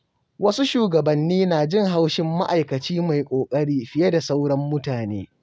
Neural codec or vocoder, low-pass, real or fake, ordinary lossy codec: none; none; real; none